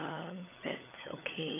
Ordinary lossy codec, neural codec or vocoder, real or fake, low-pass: none; codec, 16 kHz, 16 kbps, FunCodec, trained on LibriTTS, 50 frames a second; fake; 3.6 kHz